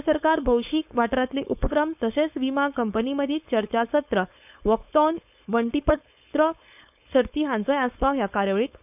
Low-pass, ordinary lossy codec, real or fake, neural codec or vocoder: 3.6 kHz; none; fake; codec, 16 kHz, 4.8 kbps, FACodec